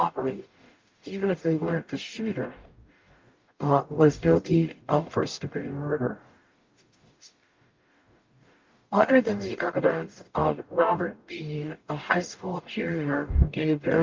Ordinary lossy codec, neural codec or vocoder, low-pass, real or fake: Opus, 24 kbps; codec, 44.1 kHz, 0.9 kbps, DAC; 7.2 kHz; fake